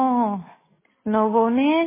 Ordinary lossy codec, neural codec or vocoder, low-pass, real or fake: MP3, 16 kbps; none; 3.6 kHz; real